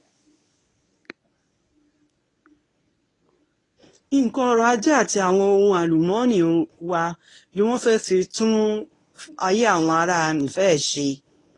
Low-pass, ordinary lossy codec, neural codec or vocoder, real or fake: 10.8 kHz; AAC, 32 kbps; codec, 24 kHz, 0.9 kbps, WavTokenizer, medium speech release version 1; fake